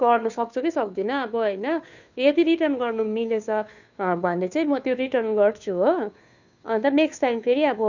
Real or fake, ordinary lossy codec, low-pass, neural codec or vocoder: fake; none; 7.2 kHz; codec, 16 kHz, 2 kbps, FunCodec, trained on Chinese and English, 25 frames a second